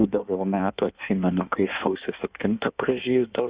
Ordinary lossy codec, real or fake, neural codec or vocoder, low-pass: Opus, 64 kbps; fake; codec, 16 kHz in and 24 kHz out, 1.1 kbps, FireRedTTS-2 codec; 3.6 kHz